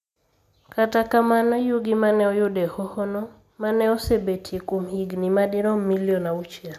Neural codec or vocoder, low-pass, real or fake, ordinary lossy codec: none; 14.4 kHz; real; none